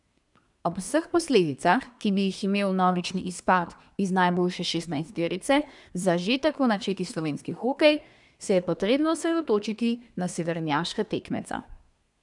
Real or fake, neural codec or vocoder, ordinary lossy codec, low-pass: fake; codec, 24 kHz, 1 kbps, SNAC; none; 10.8 kHz